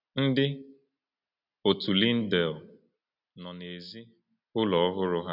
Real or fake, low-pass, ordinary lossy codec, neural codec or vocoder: real; 5.4 kHz; none; none